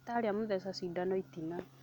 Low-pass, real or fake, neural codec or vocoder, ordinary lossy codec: 19.8 kHz; real; none; none